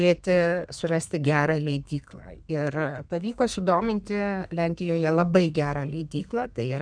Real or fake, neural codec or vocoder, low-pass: fake; codec, 32 kHz, 1.9 kbps, SNAC; 9.9 kHz